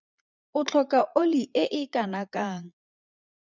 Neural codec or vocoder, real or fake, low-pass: vocoder, 22.05 kHz, 80 mel bands, Vocos; fake; 7.2 kHz